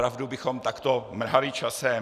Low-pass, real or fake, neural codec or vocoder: 14.4 kHz; real; none